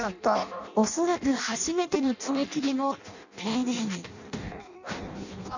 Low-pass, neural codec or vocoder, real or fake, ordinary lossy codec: 7.2 kHz; codec, 16 kHz in and 24 kHz out, 0.6 kbps, FireRedTTS-2 codec; fake; none